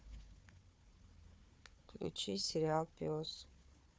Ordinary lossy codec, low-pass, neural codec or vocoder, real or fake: none; none; codec, 16 kHz, 4 kbps, FunCodec, trained on Chinese and English, 50 frames a second; fake